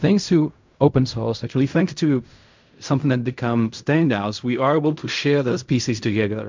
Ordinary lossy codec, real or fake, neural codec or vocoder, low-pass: MP3, 64 kbps; fake; codec, 16 kHz in and 24 kHz out, 0.4 kbps, LongCat-Audio-Codec, fine tuned four codebook decoder; 7.2 kHz